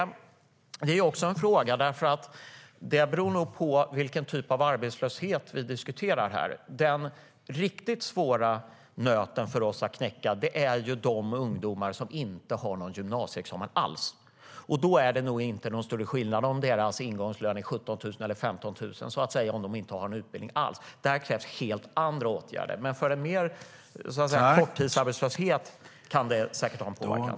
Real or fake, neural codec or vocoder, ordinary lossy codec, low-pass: real; none; none; none